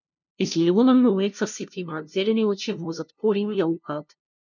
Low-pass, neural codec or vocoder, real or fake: 7.2 kHz; codec, 16 kHz, 0.5 kbps, FunCodec, trained on LibriTTS, 25 frames a second; fake